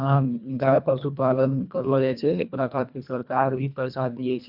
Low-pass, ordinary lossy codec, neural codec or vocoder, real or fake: 5.4 kHz; none; codec, 24 kHz, 1.5 kbps, HILCodec; fake